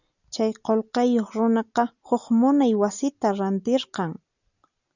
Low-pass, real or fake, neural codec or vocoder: 7.2 kHz; real; none